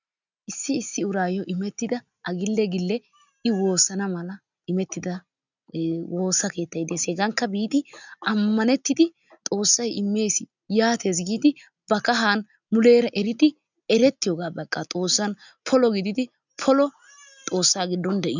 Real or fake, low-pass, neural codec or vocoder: real; 7.2 kHz; none